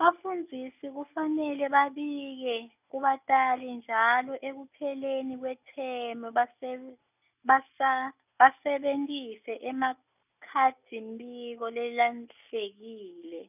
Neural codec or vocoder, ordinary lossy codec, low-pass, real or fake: none; none; 3.6 kHz; real